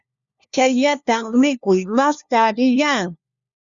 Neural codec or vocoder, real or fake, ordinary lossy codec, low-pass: codec, 16 kHz, 1 kbps, FunCodec, trained on LibriTTS, 50 frames a second; fake; Opus, 64 kbps; 7.2 kHz